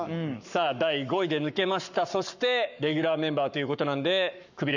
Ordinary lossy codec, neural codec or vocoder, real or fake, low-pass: none; codec, 44.1 kHz, 7.8 kbps, Pupu-Codec; fake; 7.2 kHz